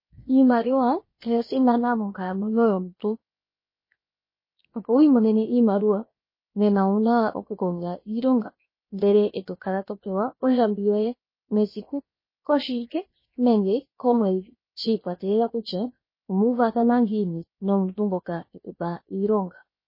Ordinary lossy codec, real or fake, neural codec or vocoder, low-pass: MP3, 24 kbps; fake; codec, 16 kHz, 0.7 kbps, FocalCodec; 5.4 kHz